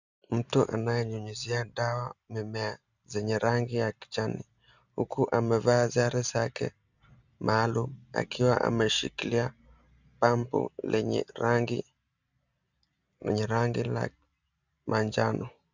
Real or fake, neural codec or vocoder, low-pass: real; none; 7.2 kHz